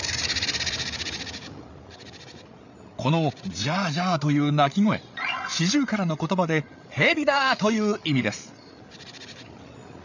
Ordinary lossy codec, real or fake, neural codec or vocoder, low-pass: none; fake; codec, 16 kHz, 8 kbps, FreqCodec, larger model; 7.2 kHz